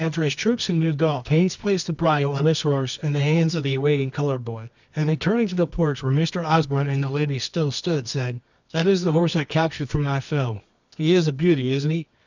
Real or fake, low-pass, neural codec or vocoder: fake; 7.2 kHz; codec, 24 kHz, 0.9 kbps, WavTokenizer, medium music audio release